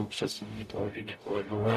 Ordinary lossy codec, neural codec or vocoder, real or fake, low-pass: AAC, 96 kbps; codec, 44.1 kHz, 0.9 kbps, DAC; fake; 14.4 kHz